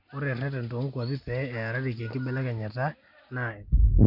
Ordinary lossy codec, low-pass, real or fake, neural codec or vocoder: none; 5.4 kHz; real; none